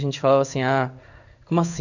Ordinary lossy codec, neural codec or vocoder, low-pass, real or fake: none; codec, 16 kHz in and 24 kHz out, 1 kbps, XY-Tokenizer; 7.2 kHz; fake